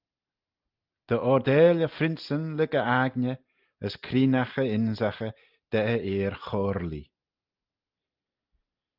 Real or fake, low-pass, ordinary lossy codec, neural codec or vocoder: real; 5.4 kHz; Opus, 32 kbps; none